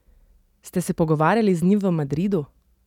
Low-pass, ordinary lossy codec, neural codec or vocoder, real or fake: 19.8 kHz; none; none; real